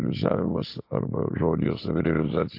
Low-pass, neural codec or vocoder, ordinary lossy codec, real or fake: 5.4 kHz; none; AAC, 32 kbps; real